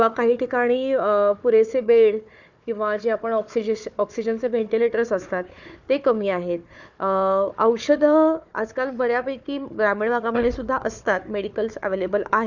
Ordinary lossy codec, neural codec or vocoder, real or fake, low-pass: none; codec, 16 kHz, 4 kbps, FunCodec, trained on Chinese and English, 50 frames a second; fake; 7.2 kHz